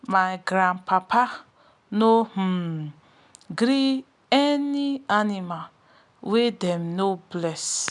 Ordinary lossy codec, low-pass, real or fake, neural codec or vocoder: none; 10.8 kHz; real; none